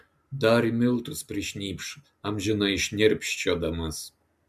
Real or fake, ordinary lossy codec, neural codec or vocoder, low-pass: real; MP3, 96 kbps; none; 14.4 kHz